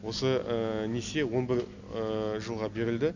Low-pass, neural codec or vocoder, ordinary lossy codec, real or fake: 7.2 kHz; none; none; real